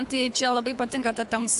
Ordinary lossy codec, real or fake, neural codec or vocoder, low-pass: AAC, 64 kbps; fake; codec, 24 kHz, 3 kbps, HILCodec; 10.8 kHz